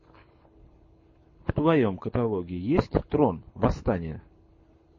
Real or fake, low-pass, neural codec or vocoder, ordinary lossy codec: fake; 7.2 kHz; codec, 24 kHz, 6 kbps, HILCodec; MP3, 32 kbps